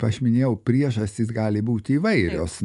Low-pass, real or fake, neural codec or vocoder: 10.8 kHz; real; none